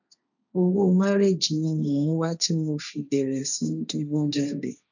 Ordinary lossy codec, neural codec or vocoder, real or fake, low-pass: none; codec, 16 kHz, 1.1 kbps, Voila-Tokenizer; fake; 7.2 kHz